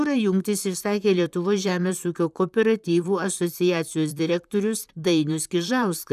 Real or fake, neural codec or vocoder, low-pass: fake; vocoder, 44.1 kHz, 128 mel bands, Pupu-Vocoder; 14.4 kHz